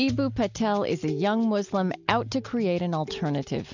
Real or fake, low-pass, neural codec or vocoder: real; 7.2 kHz; none